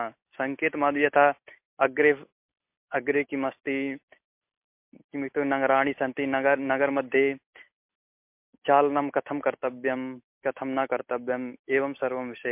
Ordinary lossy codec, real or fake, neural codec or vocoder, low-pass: MP3, 32 kbps; real; none; 3.6 kHz